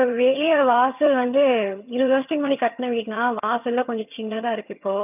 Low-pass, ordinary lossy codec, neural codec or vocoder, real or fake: 3.6 kHz; none; vocoder, 22.05 kHz, 80 mel bands, HiFi-GAN; fake